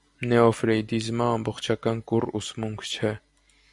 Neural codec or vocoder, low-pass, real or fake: vocoder, 44.1 kHz, 128 mel bands every 256 samples, BigVGAN v2; 10.8 kHz; fake